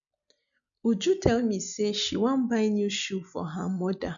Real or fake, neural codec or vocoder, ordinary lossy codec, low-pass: real; none; none; 7.2 kHz